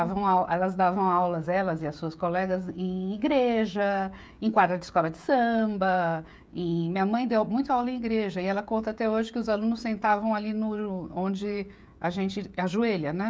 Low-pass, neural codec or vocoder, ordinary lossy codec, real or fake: none; codec, 16 kHz, 16 kbps, FreqCodec, smaller model; none; fake